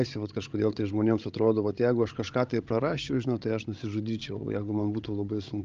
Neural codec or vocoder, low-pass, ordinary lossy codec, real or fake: codec, 16 kHz, 16 kbps, FreqCodec, larger model; 7.2 kHz; Opus, 24 kbps; fake